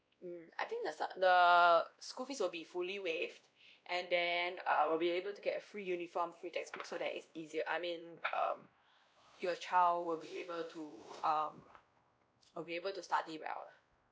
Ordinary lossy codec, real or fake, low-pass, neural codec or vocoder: none; fake; none; codec, 16 kHz, 1 kbps, X-Codec, WavLM features, trained on Multilingual LibriSpeech